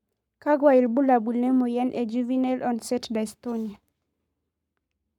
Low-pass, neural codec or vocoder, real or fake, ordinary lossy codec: 19.8 kHz; codec, 44.1 kHz, 7.8 kbps, Pupu-Codec; fake; none